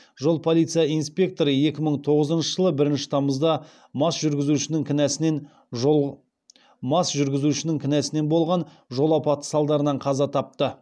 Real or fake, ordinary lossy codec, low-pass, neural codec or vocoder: real; none; none; none